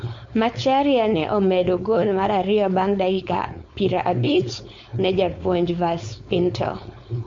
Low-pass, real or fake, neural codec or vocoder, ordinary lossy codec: 7.2 kHz; fake; codec, 16 kHz, 4.8 kbps, FACodec; MP3, 48 kbps